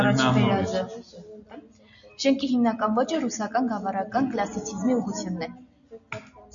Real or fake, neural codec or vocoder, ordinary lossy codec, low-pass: real; none; MP3, 96 kbps; 7.2 kHz